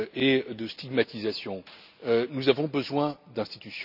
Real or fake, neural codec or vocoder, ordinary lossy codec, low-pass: real; none; none; 5.4 kHz